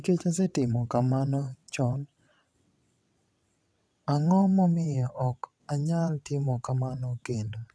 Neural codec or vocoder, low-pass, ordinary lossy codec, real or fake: vocoder, 22.05 kHz, 80 mel bands, WaveNeXt; none; none; fake